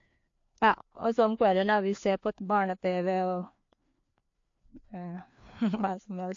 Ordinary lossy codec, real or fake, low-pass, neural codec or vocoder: AAC, 48 kbps; fake; 7.2 kHz; codec, 16 kHz, 2 kbps, FreqCodec, larger model